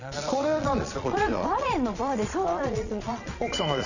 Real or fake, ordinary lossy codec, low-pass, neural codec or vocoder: fake; none; 7.2 kHz; vocoder, 22.05 kHz, 80 mel bands, Vocos